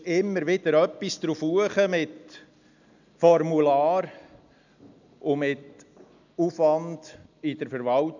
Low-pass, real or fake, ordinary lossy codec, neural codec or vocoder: 7.2 kHz; real; none; none